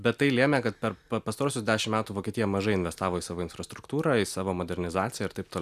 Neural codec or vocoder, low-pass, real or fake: none; 14.4 kHz; real